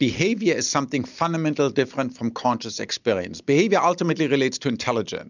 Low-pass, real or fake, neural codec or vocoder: 7.2 kHz; real; none